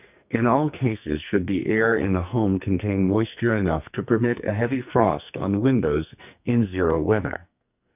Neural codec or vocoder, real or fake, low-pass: codec, 44.1 kHz, 2.6 kbps, SNAC; fake; 3.6 kHz